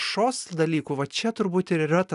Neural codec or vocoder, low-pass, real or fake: none; 10.8 kHz; real